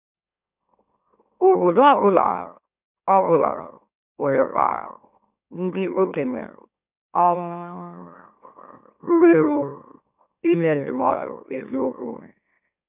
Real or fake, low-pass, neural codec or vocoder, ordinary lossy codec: fake; 3.6 kHz; autoencoder, 44.1 kHz, a latent of 192 numbers a frame, MeloTTS; none